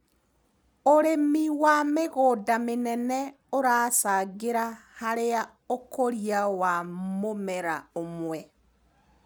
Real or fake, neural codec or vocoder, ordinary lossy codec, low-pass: real; none; none; none